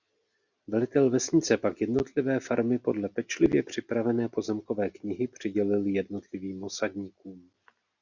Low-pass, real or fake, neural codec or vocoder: 7.2 kHz; real; none